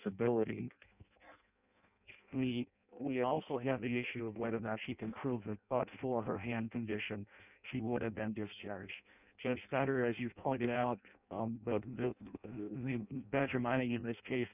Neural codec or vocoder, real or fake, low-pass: codec, 16 kHz in and 24 kHz out, 0.6 kbps, FireRedTTS-2 codec; fake; 3.6 kHz